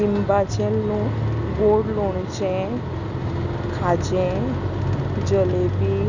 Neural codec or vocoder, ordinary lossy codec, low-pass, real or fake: none; none; 7.2 kHz; real